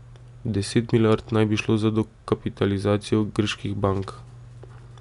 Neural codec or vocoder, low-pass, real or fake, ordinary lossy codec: none; 10.8 kHz; real; none